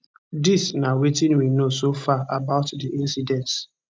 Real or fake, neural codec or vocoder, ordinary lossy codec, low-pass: real; none; none; none